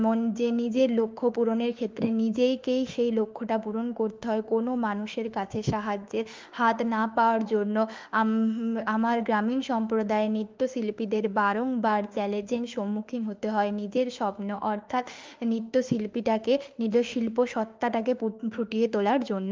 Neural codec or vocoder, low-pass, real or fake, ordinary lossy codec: autoencoder, 48 kHz, 32 numbers a frame, DAC-VAE, trained on Japanese speech; 7.2 kHz; fake; Opus, 32 kbps